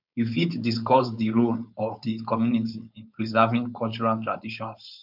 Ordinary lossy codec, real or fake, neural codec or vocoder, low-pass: none; fake; codec, 16 kHz, 4.8 kbps, FACodec; 5.4 kHz